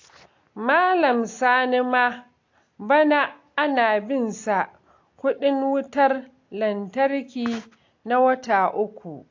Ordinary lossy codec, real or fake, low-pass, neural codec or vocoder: AAC, 48 kbps; real; 7.2 kHz; none